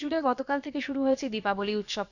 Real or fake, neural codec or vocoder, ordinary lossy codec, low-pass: fake; codec, 16 kHz, about 1 kbps, DyCAST, with the encoder's durations; none; 7.2 kHz